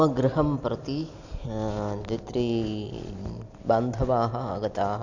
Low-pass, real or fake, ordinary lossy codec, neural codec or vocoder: 7.2 kHz; real; none; none